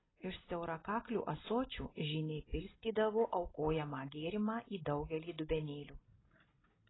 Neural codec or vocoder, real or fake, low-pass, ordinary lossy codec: none; real; 7.2 kHz; AAC, 16 kbps